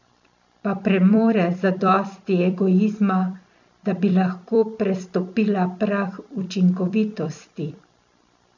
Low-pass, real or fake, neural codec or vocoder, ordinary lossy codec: 7.2 kHz; fake; vocoder, 44.1 kHz, 128 mel bands every 256 samples, BigVGAN v2; none